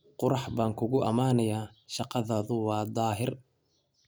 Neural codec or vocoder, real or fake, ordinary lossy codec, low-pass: none; real; none; none